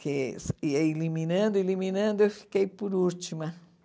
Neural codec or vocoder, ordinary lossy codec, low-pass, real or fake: none; none; none; real